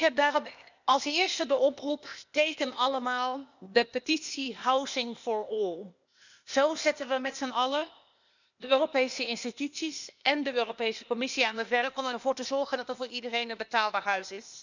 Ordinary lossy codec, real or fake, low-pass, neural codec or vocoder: none; fake; 7.2 kHz; codec, 16 kHz, 0.8 kbps, ZipCodec